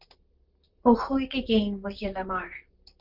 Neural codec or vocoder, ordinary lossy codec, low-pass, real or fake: vocoder, 44.1 kHz, 128 mel bands, Pupu-Vocoder; Opus, 24 kbps; 5.4 kHz; fake